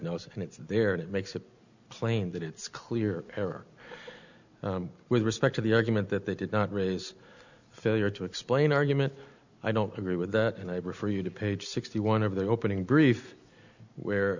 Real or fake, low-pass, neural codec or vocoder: real; 7.2 kHz; none